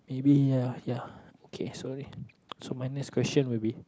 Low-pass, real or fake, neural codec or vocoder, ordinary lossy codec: none; real; none; none